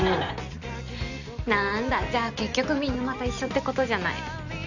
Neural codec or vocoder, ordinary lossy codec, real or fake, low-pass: none; none; real; 7.2 kHz